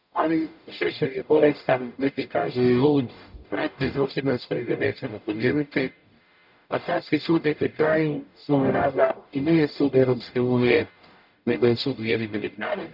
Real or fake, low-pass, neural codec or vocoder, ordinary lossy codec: fake; 5.4 kHz; codec, 44.1 kHz, 0.9 kbps, DAC; none